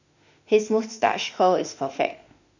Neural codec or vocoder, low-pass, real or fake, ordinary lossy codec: autoencoder, 48 kHz, 32 numbers a frame, DAC-VAE, trained on Japanese speech; 7.2 kHz; fake; none